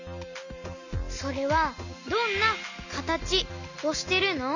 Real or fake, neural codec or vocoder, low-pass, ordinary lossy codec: real; none; 7.2 kHz; none